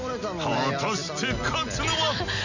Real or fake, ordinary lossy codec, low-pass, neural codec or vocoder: real; none; 7.2 kHz; none